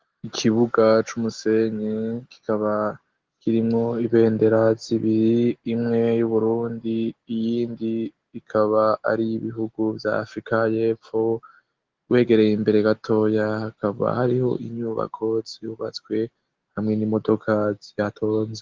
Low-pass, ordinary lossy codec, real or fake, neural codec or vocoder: 7.2 kHz; Opus, 16 kbps; real; none